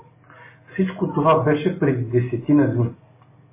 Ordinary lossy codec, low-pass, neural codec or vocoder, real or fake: MP3, 16 kbps; 3.6 kHz; none; real